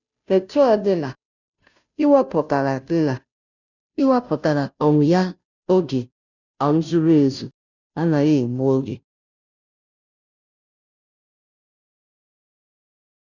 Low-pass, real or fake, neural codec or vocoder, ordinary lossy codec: 7.2 kHz; fake; codec, 16 kHz, 0.5 kbps, FunCodec, trained on Chinese and English, 25 frames a second; none